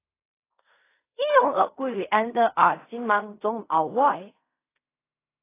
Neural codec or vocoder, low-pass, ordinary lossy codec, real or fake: codec, 16 kHz in and 24 kHz out, 0.4 kbps, LongCat-Audio-Codec, fine tuned four codebook decoder; 3.6 kHz; AAC, 24 kbps; fake